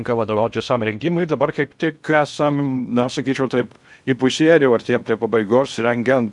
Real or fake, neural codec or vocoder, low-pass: fake; codec, 16 kHz in and 24 kHz out, 0.6 kbps, FocalCodec, streaming, 2048 codes; 10.8 kHz